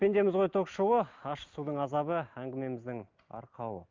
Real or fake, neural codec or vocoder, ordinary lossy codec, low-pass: real; none; Opus, 24 kbps; 7.2 kHz